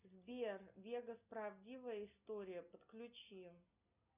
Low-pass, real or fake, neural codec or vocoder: 3.6 kHz; real; none